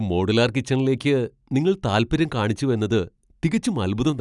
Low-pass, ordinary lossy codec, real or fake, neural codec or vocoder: 10.8 kHz; none; real; none